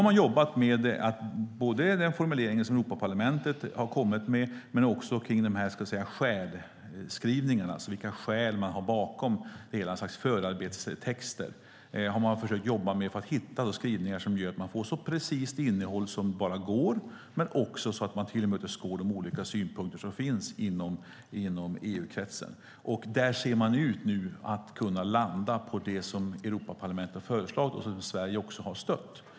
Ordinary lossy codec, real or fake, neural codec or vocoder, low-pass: none; real; none; none